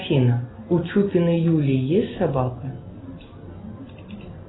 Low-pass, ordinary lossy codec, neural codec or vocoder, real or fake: 7.2 kHz; AAC, 16 kbps; none; real